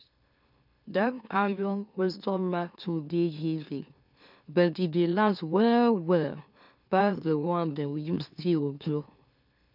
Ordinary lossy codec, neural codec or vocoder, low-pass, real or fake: none; autoencoder, 44.1 kHz, a latent of 192 numbers a frame, MeloTTS; 5.4 kHz; fake